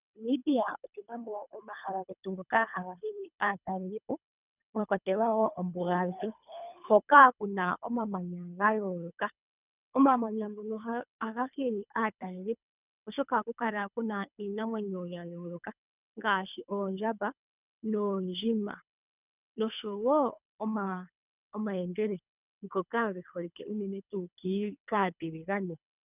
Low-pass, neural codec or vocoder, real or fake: 3.6 kHz; codec, 24 kHz, 3 kbps, HILCodec; fake